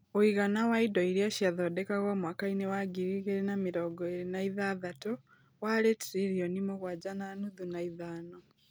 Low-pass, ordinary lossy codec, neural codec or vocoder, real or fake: none; none; none; real